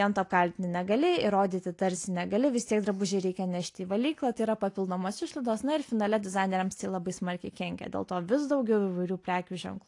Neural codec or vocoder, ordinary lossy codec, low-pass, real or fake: none; AAC, 48 kbps; 10.8 kHz; real